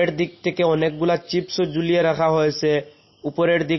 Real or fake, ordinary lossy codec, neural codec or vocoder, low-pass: real; MP3, 24 kbps; none; 7.2 kHz